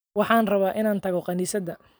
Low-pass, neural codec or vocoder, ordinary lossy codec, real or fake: none; none; none; real